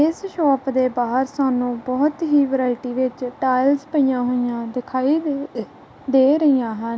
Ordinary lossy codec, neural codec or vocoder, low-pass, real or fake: none; none; none; real